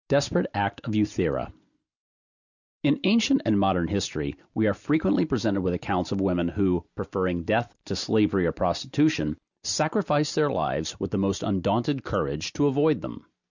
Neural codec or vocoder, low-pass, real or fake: none; 7.2 kHz; real